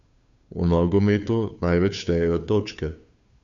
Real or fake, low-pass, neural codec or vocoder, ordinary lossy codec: fake; 7.2 kHz; codec, 16 kHz, 2 kbps, FunCodec, trained on Chinese and English, 25 frames a second; none